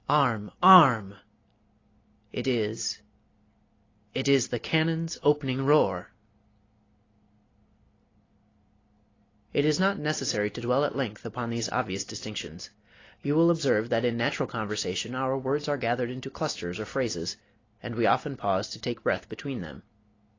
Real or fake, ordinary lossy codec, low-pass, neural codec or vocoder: real; AAC, 32 kbps; 7.2 kHz; none